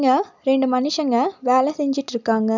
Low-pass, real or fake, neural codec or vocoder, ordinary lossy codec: 7.2 kHz; real; none; none